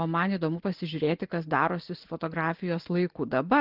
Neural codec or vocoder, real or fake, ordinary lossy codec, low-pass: none; real; Opus, 16 kbps; 5.4 kHz